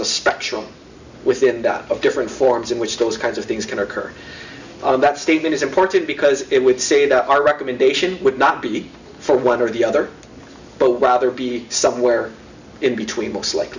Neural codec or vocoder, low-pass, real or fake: none; 7.2 kHz; real